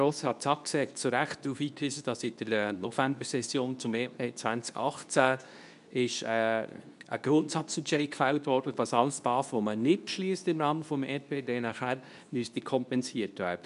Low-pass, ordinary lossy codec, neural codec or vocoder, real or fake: 10.8 kHz; none; codec, 24 kHz, 0.9 kbps, WavTokenizer, medium speech release version 2; fake